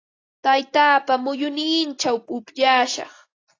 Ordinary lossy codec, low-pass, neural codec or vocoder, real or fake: AAC, 32 kbps; 7.2 kHz; none; real